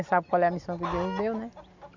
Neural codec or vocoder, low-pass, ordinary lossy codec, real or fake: none; 7.2 kHz; none; real